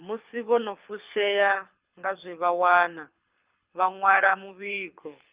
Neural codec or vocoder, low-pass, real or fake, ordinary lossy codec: codec, 24 kHz, 6 kbps, HILCodec; 3.6 kHz; fake; Opus, 24 kbps